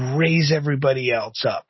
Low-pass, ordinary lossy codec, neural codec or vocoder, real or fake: 7.2 kHz; MP3, 24 kbps; none; real